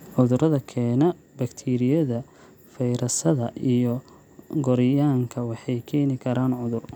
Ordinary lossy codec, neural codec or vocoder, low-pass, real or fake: none; none; 19.8 kHz; real